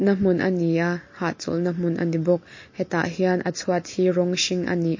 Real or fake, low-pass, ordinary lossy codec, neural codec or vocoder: real; 7.2 kHz; MP3, 32 kbps; none